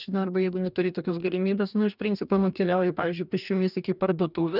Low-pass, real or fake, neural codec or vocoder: 5.4 kHz; fake; codec, 44.1 kHz, 2.6 kbps, DAC